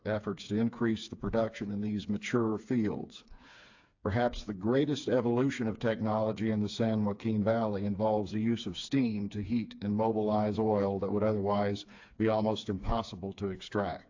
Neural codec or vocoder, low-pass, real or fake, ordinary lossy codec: codec, 16 kHz, 4 kbps, FreqCodec, smaller model; 7.2 kHz; fake; AAC, 48 kbps